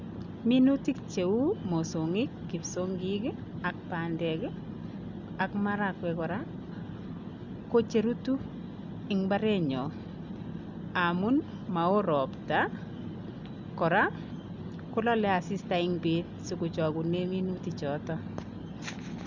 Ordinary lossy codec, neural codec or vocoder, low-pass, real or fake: none; none; 7.2 kHz; real